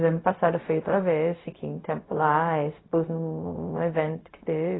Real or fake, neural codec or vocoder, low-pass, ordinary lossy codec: fake; codec, 16 kHz, 0.4 kbps, LongCat-Audio-Codec; 7.2 kHz; AAC, 16 kbps